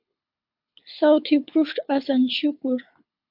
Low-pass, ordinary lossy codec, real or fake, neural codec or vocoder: 5.4 kHz; MP3, 48 kbps; fake; codec, 24 kHz, 6 kbps, HILCodec